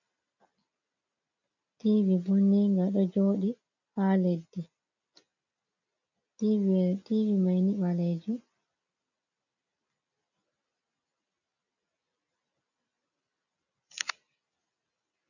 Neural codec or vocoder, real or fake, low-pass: none; real; 7.2 kHz